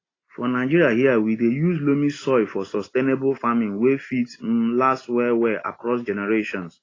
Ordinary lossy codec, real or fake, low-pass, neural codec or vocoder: AAC, 32 kbps; real; 7.2 kHz; none